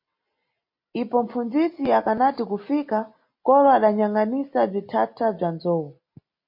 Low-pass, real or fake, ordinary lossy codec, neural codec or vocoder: 5.4 kHz; real; MP3, 32 kbps; none